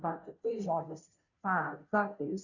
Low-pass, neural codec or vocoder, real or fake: 7.2 kHz; codec, 16 kHz, 0.5 kbps, FunCodec, trained on Chinese and English, 25 frames a second; fake